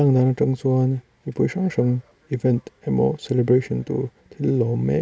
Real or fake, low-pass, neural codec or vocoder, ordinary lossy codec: real; none; none; none